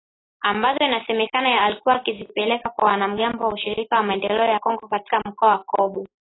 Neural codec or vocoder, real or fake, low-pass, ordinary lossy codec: none; real; 7.2 kHz; AAC, 16 kbps